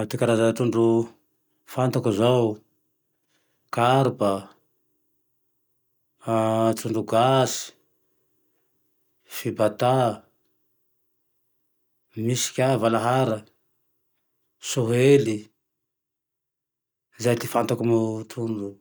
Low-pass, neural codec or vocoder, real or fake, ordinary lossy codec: none; none; real; none